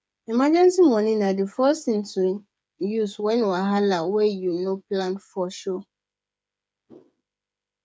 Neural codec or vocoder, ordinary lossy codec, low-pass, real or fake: codec, 16 kHz, 8 kbps, FreqCodec, smaller model; none; none; fake